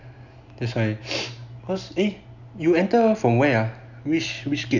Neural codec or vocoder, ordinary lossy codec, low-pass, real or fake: none; none; 7.2 kHz; real